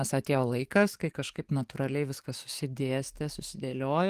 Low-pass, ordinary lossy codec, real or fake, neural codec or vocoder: 14.4 kHz; Opus, 24 kbps; fake; codec, 44.1 kHz, 7.8 kbps, DAC